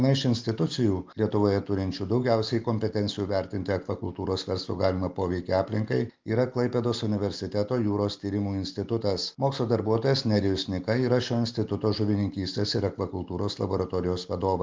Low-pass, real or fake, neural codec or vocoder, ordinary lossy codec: 7.2 kHz; real; none; Opus, 32 kbps